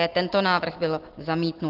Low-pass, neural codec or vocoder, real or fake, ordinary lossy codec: 5.4 kHz; none; real; Opus, 16 kbps